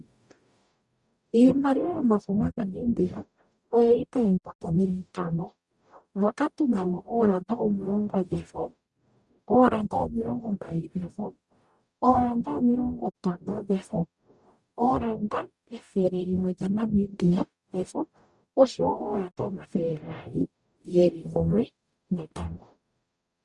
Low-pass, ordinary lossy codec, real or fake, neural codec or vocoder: 10.8 kHz; Opus, 64 kbps; fake; codec, 44.1 kHz, 0.9 kbps, DAC